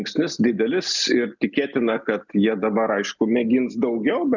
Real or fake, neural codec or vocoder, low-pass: real; none; 7.2 kHz